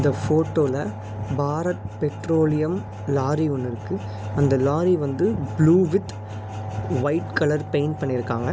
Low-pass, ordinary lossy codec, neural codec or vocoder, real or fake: none; none; none; real